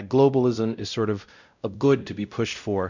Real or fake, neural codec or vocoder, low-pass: fake; codec, 16 kHz, 0.5 kbps, X-Codec, WavLM features, trained on Multilingual LibriSpeech; 7.2 kHz